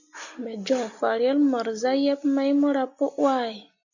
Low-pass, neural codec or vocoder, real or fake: 7.2 kHz; none; real